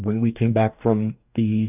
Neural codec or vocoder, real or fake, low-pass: codec, 44.1 kHz, 2.6 kbps, DAC; fake; 3.6 kHz